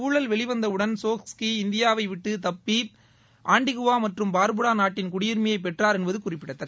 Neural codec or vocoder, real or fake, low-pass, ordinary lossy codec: none; real; none; none